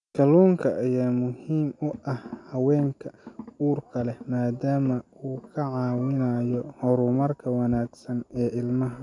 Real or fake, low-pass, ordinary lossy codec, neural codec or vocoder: real; 10.8 kHz; none; none